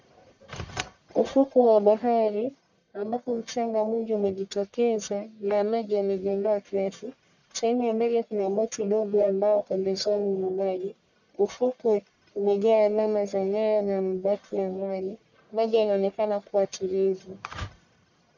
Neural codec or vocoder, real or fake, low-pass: codec, 44.1 kHz, 1.7 kbps, Pupu-Codec; fake; 7.2 kHz